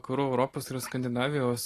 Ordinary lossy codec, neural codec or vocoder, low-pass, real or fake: AAC, 48 kbps; none; 14.4 kHz; real